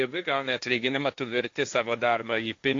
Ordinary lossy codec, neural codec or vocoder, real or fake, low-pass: AAC, 48 kbps; codec, 16 kHz, 1.1 kbps, Voila-Tokenizer; fake; 7.2 kHz